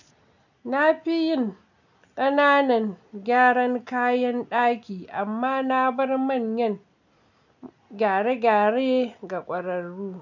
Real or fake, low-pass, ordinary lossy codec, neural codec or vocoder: real; 7.2 kHz; none; none